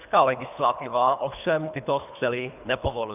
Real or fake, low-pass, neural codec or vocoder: fake; 3.6 kHz; codec, 24 kHz, 3 kbps, HILCodec